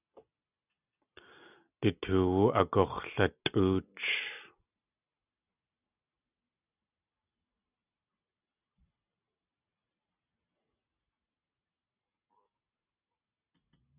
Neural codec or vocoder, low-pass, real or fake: none; 3.6 kHz; real